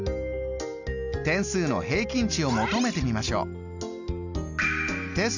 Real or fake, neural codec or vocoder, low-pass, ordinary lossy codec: real; none; 7.2 kHz; none